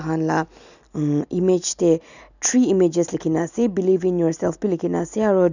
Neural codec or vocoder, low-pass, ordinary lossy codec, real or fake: none; 7.2 kHz; none; real